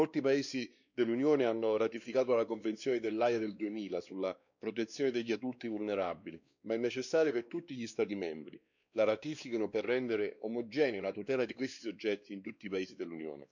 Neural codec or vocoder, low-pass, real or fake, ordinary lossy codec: codec, 16 kHz, 2 kbps, X-Codec, WavLM features, trained on Multilingual LibriSpeech; 7.2 kHz; fake; none